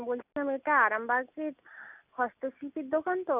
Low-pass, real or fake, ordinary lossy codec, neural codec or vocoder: 3.6 kHz; real; none; none